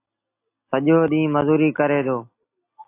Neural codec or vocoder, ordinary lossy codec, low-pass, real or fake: none; AAC, 24 kbps; 3.6 kHz; real